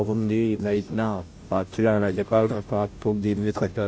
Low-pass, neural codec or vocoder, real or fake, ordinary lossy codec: none; codec, 16 kHz, 0.5 kbps, FunCodec, trained on Chinese and English, 25 frames a second; fake; none